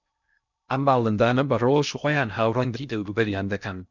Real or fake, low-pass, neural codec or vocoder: fake; 7.2 kHz; codec, 16 kHz in and 24 kHz out, 0.6 kbps, FocalCodec, streaming, 2048 codes